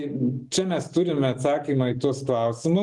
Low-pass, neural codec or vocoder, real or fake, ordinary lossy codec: 10.8 kHz; none; real; Opus, 24 kbps